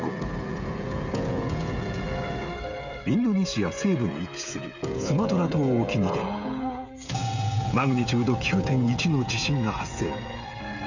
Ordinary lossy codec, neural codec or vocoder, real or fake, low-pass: none; codec, 16 kHz, 16 kbps, FreqCodec, smaller model; fake; 7.2 kHz